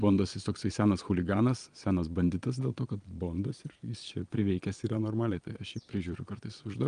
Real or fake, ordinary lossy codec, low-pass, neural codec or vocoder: fake; Opus, 32 kbps; 9.9 kHz; vocoder, 22.05 kHz, 80 mel bands, Vocos